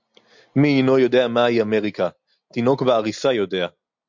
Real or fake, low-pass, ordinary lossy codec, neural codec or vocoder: real; 7.2 kHz; MP3, 64 kbps; none